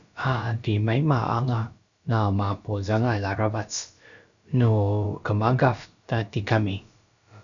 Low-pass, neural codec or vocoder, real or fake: 7.2 kHz; codec, 16 kHz, about 1 kbps, DyCAST, with the encoder's durations; fake